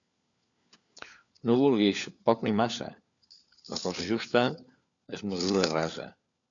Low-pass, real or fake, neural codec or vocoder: 7.2 kHz; fake; codec, 16 kHz, 4 kbps, FunCodec, trained on LibriTTS, 50 frames a second